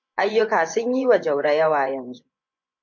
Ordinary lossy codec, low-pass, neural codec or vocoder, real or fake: MP3, 48 kbps; 7.2 kHz; vocoder, 24 kHz, 100 mel bands, Vocos; fake